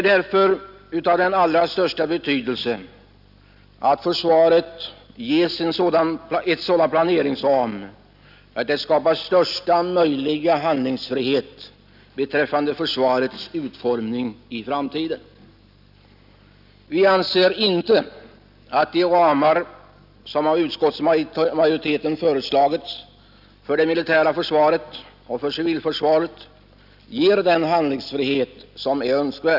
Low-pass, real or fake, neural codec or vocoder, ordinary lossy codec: 5.4 kHz; real; none; none